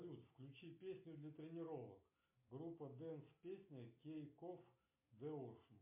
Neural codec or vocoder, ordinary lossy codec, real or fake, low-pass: none; MP3, 24 kbps; real; 3.6 kHz